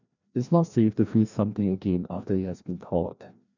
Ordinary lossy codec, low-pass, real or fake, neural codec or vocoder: none; 7.2 kHz; fake; codec, 16 kHz, 1 kbps, FreqCodec, larger model